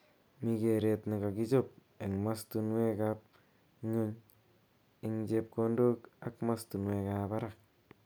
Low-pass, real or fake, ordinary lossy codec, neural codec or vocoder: none; real; none; none